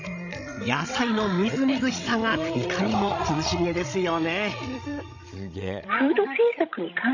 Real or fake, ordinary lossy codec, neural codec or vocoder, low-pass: fake; none; codec, 16 kHz, 16 kbps, FreqCodec, larger model; 7.2 kHz